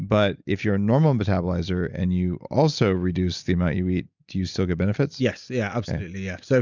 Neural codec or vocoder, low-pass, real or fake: none; 7.2 kHz; real